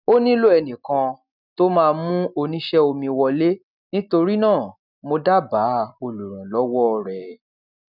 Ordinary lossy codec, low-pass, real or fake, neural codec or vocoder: none; 5.4 kHz; real; none